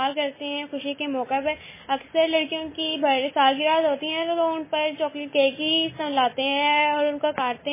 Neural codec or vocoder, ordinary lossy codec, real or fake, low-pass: none; MP3, 16 kbps; real; 3.6 kHz